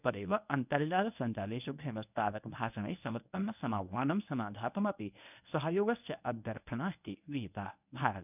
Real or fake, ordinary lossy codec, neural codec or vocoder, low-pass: fake; none; codec, 24 kHz, 0.9 kbps, WavTokenizer, medium speech release version 1; 3.6 kHz